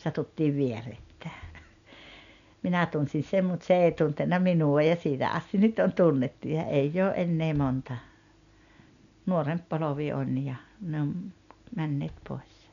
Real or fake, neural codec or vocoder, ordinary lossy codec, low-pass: real; none; none; 7.2 kHz